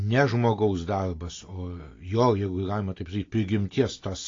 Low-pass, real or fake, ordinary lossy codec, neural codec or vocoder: 7.2 kHz; real; AAC, 32 kbps; none